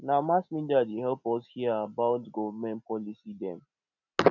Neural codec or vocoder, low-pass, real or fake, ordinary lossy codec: none; 7.2 kHz; real; none